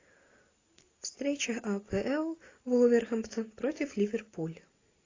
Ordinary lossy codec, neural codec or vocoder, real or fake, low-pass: AAC, 32 kbps; none; real; 7.2 kHz